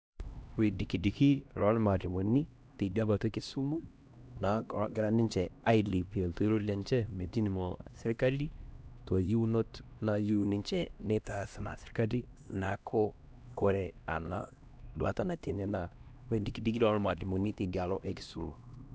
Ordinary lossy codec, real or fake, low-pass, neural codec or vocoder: none; fake; none; codec, 16 kHz, 1 kbps, X-Codec, HuBERT features, trained on LibriSpeech